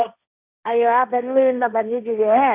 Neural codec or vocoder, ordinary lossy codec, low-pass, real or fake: codec, 16 kHz, 1.1 kbps, Voila-Tokenizer; none; 3.6 kHz; fake